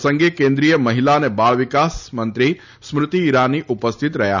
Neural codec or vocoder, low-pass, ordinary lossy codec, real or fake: none; 7.2 kHz; none; real